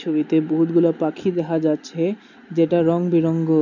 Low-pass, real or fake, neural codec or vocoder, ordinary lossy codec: 7.2 kHz; real; none; none